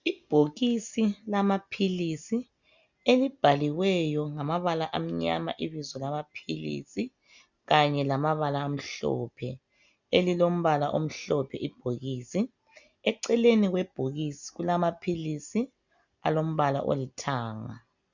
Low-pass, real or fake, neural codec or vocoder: 7.2 kHz; real; none